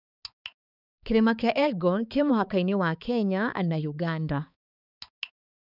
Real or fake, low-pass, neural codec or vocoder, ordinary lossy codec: fake; 5.4 kHz; codec, 16 kHz, 4 kbps, X-Codec, HuBERT features, trained on balanced general audio; none